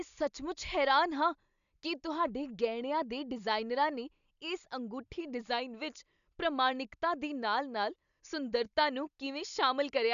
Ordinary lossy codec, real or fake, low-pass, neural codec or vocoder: none; real; 7.2 kHz; none